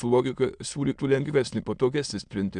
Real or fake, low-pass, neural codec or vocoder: fake; 9.9 kHz; autoencoder, 22.05 kHz, a latent of 192 numbers a frame, VITS, trained on many speakers